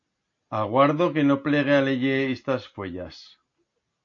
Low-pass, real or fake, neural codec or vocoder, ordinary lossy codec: 7.2 kHz; real; none; AAC, 48 kbps